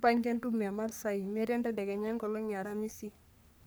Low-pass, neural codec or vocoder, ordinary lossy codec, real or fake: none; codec, 44.1 kHz, 3.4 kbps, Pupu-Codec; none; fake